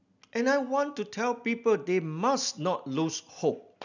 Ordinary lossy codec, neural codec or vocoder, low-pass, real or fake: none; none; 7.2 kHz; real